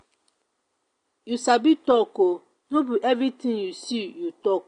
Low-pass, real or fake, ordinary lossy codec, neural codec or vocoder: 9.9 kHz; real; AAC, 48 kbps; none